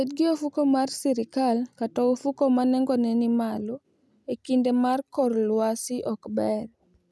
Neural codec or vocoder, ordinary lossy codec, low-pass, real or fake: none; none; none; real